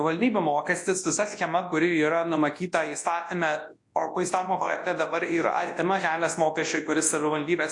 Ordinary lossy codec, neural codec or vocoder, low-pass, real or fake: AAC, 48 kbps; codec, 24 kHz, 0.9 kbps, WavTokenizer, large speech release; 10.8 kHz; fake